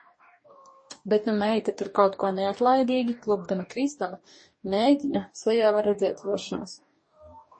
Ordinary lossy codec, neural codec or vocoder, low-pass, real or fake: MP3, 32 kbps; codec, 44.1 kHz, 2.6 kbps, DAC; 9.9 kHz; fake